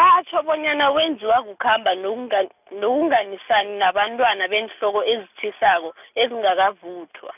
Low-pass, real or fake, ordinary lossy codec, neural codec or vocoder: 3.6 kHz; real; none; none